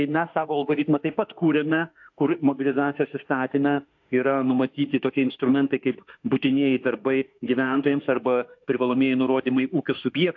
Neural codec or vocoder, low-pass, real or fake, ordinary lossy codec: autoencoder, 48 kHz, 32 numbers a frame, DAC-VAE, trained on Japanese speech; 7.2 kHz; fake; AAC, 48 kbps